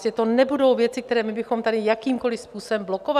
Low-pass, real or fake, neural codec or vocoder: 14.4 kHz; real; none